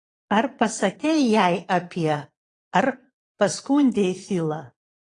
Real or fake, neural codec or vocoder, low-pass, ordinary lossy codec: fake; vocoder, 22.05 kHz, 80 mel bands, Vocos; 9.9 kHz; AAC, 32 kbps